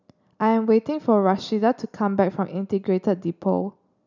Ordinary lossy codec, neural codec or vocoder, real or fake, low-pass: none; none; real; 7.2 kHz